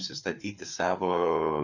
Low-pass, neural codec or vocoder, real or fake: 7.2 kHz; codec, 16 kHz, 4 kbps, FreqCodec, larger model; fake